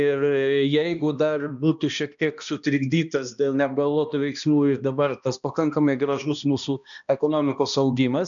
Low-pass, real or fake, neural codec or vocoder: 7.2 kHz; fake; codec, 16 kHz, 1 kbps, X-Codec, HuBERT features, trained on balanced general audio